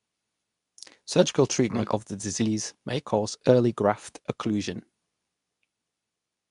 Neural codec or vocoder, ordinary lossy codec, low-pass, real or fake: codec, 24 kHz, 0.9 kbps, WavTokenizer, medium speech release version 2; none; 10.8 kHz; fake